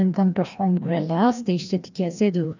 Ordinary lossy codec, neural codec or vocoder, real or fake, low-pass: none; codec, 16 kHz, 1 kbps, FreqCodec, larger model; fake; 7.2 kHz